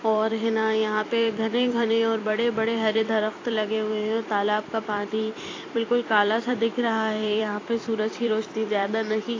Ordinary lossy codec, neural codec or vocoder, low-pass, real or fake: AAC, 32 kbps; vocoder, 44.1 kHz, 128 mel bands every 256 samples, BigVGAN v2; 7.2 kHz; fake